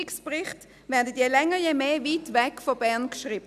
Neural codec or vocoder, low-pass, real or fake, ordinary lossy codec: none; 14.4 kHz; real; none